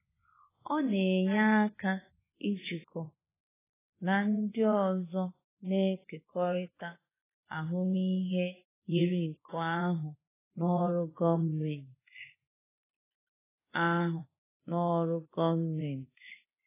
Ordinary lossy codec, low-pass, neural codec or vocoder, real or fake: AAC, 16 kbps; 3.6 kHz; vocoder, 24 kHz, 100 mel bands, Vocos; fake